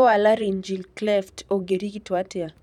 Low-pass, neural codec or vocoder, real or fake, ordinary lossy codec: 19.8 kHz; vocoder, 44.1 kHz, 128 mel bands every 512 samples, BigVGAN v2; fake; none